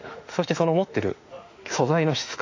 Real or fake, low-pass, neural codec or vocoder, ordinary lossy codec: fake; 7.2 kHz; autoencoder, 48 kHz, 32 numbers a frame, DAC-VAE, trained on Japanese speech; AAC, 48 kbps